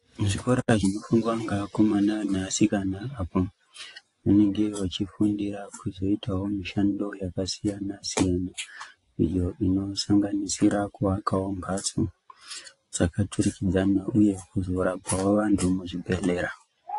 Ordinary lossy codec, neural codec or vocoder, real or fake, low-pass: AAC, 48 kbps; vocoder, 24 kHz, 100 mel bands, Vocos; fake; 10.8 kHz